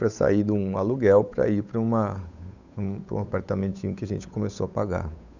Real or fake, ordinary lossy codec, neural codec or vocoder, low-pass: fake; none; codec, 16 kHz, 8 kbps, FunCodec, trained on Chinese and English, 25 frames a second; 7.2 kHz